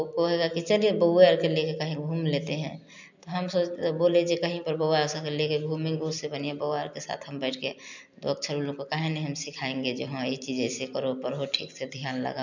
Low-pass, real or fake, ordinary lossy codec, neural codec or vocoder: 7.2 kHz; real; none; none